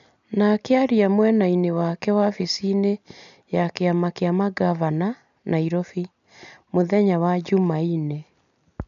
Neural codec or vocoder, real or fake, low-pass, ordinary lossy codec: none; real; 7.2 kHz; none